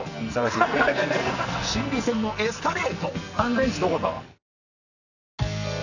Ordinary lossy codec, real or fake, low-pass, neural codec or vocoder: none; fake; 7.2 kHz; codec, 44.1 kHz, 2.6 kbps, SNAC